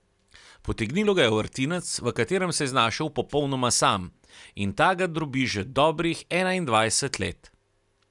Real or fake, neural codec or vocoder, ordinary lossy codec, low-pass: real; none; none; 10.8 kHz